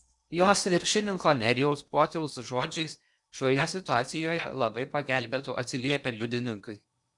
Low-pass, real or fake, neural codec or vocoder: 10.8 kHz; fake; codec, 16 kHz in and 24 kHz out, 0.8 kbps, FocalCodec, streaming, 65536 codes